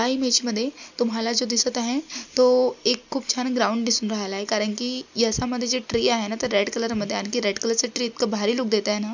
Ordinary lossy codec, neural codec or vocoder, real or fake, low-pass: none; none; real; 7.2 kHz